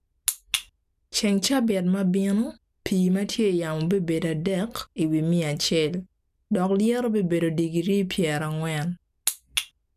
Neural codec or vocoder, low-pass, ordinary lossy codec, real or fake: none; 14.4 kHz; none; real